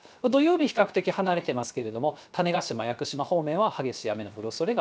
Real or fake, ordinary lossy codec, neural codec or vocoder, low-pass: fake; none; codec, 16 kHz, 0.7 kbps, FocalCodec; none